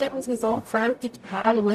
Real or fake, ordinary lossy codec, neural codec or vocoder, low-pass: fake; none; codec, 44.1 kHz, 0.9 kbps, DAC; 14.4 kHz